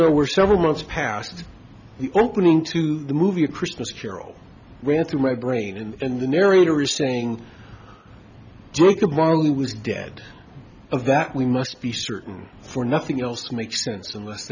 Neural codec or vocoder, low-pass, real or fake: none; 7.2 kHz; real